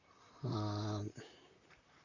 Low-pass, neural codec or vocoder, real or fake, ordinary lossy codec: 7.2 kHz; vocoder, 44.1 kHz, 128 mel bands, Pupu-Vocoder; fake; Opus, 64 kbps